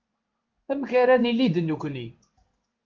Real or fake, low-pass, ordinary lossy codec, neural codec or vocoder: fake; 7.2 kHz; Opus, 32 kbps; codec, 16 kHz in and 24 kHz out, 1 kbps, XY-Tokenizer